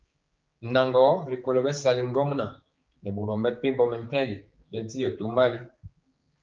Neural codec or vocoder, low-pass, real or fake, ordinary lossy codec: codec, 16 kHz, 4 kbps, X-Codec, HuBERT features, trained on general audio; 7.2 kHz; fake; Opus, 24 kbps